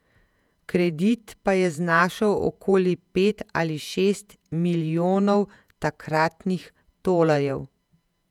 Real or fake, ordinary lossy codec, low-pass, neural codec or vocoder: fake; none; 19.8 kHz; vocoder, 48 kHz, 128 mel bands, Vocos